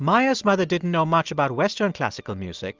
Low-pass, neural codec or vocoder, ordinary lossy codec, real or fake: 7.2 kHz; none; Opus, 32 kbps; real